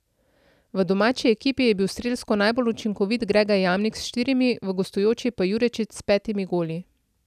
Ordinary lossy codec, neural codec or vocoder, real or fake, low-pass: none; none; real; 14.4 kHz